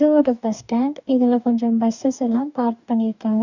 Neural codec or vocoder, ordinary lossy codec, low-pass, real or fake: codec, 44.1 kHz, 2.6 kbps, DAC; none; 7.2 kHz; fake